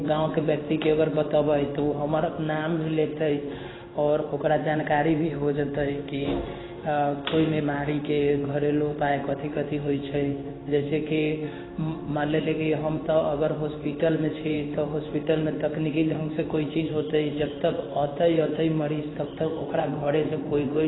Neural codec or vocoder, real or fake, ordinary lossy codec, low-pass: codec, 16 kHz in and 24 kHz out, 1 kbps, XY-Tokenizer; fake; AAC, 16 kbps; 7.2 kHz